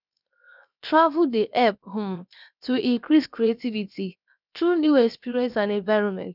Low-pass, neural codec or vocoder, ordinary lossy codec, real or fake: 5.4 kHz; codec, 16 kHz, 0.7 kbps, FocalCodec; none; fake